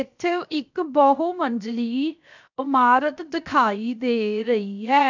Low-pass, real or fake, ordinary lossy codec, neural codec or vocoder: 7.2 kHz; fake; none; codec, 16 kHz, 0.7 kbps, FocalCodec